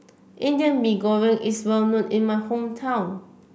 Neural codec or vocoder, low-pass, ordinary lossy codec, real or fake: none; none; none; real